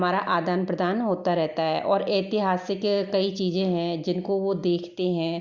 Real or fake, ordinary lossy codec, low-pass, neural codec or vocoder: real; Opus, 64 kbps; 7.2 kHz; none